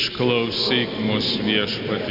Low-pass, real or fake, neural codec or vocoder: 5.4 kHz; real; none